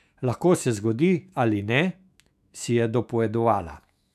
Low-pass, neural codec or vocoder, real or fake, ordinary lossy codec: 14.4 kHz; autoencoder, 48 kHz, 128 numbers a frame, DAC-VAE, trained on Japanese speech; fake; none